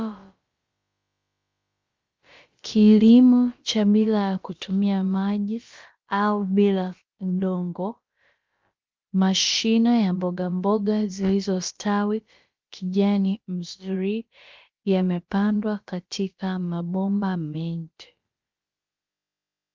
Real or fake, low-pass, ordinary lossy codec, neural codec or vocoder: fake; 7.2 kHz; Opus, 32 kbps; codec, 16 kHz, about 1 kbps, DyCAST, with the encoder's durations